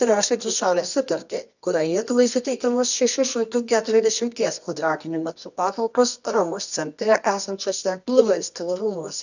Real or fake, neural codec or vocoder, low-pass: fake; codec, 24 kHz, 0.9 kbps, WavTokenizer, medium music audio release; 7.2 kHz